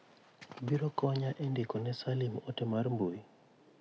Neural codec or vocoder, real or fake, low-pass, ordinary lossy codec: none; real; none; none